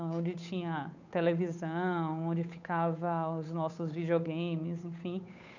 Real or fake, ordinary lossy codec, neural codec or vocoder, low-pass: fake; none; codec, 16 kHz, 8 kbps, FunCodec, trained on Chinese and English, 25 frames a second; 7.2 kHz